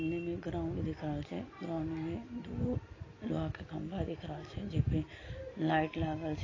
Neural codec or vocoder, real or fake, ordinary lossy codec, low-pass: none; real; AAC, 32 kbps; 7.2 kHz